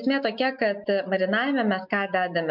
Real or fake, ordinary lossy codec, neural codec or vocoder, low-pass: real; MP3, 48 kbps; none; 5.4 kHz